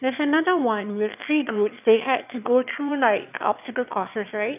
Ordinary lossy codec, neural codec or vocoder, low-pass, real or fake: none; autoencoder, 22.05 kHz, a latent of 192 numbers a frame, VITS, trained on one speaker; 3.6 kHz; fake